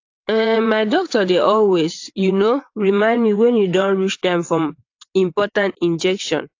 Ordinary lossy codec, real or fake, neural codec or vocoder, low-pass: AAC, 48 kbps; fake; vocoder, 22.05 kHz, 80 mel bands, WaveNeXt; 7.2 kHz